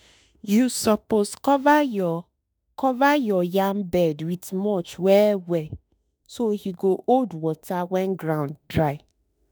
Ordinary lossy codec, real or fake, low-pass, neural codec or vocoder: none; fake; none; autoencoder, 48 kHz, 32 numbers a frame, DAC-VAE, trained on Japanese speech